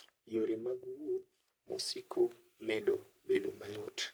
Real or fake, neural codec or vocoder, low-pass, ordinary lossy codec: fake; codec, 44.1 kHz, 3.4 kbps, Pupu-Codec; none; none